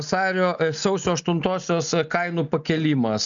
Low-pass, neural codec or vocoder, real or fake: 7.2 kHz; none; real